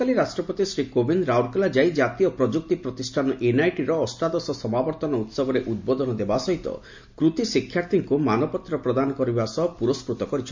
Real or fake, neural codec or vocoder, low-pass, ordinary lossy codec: real; none; 7.2 kHz; MP3, 48 kbps